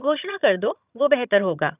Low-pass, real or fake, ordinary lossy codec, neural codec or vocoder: 3.6 kHz; fake; none; vocoder, 22.05 kHz, 80 mel bands, HiFi-GAN